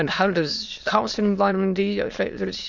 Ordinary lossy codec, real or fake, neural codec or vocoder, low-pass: Opus, 64 kbps; fake; autoencoder, 22.05 kHz, a latent of 192 numbers a frame, VITS, trained on many speakers; 7.2 kHz